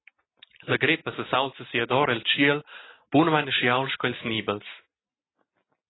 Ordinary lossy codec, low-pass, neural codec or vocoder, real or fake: AAC, 16 kbps; 7.2 kHz; none; real